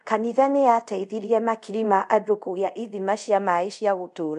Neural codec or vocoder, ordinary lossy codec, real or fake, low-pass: codec, 24 kHz, 0.5 kbps, DualCodec; MP3, 64 kbps; fake; 10.8 kHz